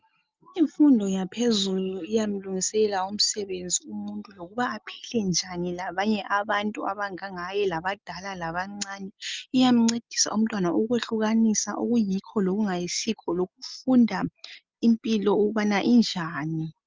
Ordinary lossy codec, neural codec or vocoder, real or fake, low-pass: Opus, 24 kbps; none; real; 7.2 kHz